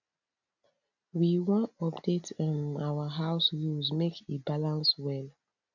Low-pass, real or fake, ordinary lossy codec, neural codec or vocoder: 7.2 kHz; real; none; none